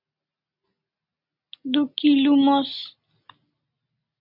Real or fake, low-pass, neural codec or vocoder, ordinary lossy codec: real; 5.4 kHz; none; MP3, 48 kbps